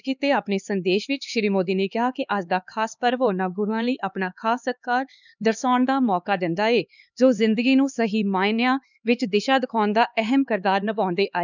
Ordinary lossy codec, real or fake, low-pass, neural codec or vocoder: none; fake; 7.2 kHz; codec, 16 kHz, 4 kbps, X-Codec, HuBERT features, trained on LibriSpeech